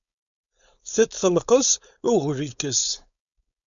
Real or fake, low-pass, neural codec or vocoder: fake; 7.2 kHz; codec, 16 kHz, 4.8 kbps, FACodec